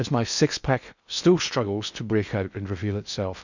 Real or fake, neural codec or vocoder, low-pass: fake; codec, 16 kHz in and 24 kHz out, 0.6 kbps, FocalCodec, streaming, 2048 codes; 7.2 kHz